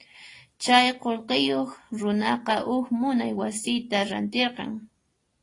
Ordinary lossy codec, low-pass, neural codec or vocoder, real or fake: AAC, 32 kbps; 10.8 kHz; none; real